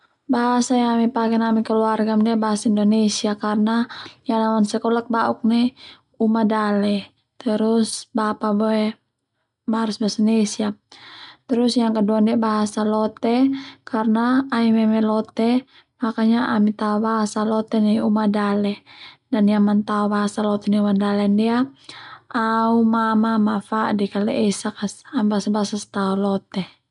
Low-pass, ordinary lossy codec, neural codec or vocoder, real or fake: 10.8 kHz; none; none; real